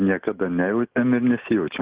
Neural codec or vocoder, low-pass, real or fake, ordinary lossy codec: none; 3.6 kHz; real; Opus, 16 kbps